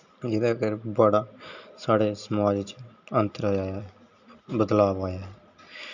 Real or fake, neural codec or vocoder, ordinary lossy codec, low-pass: real; none; none; 7.2 kHz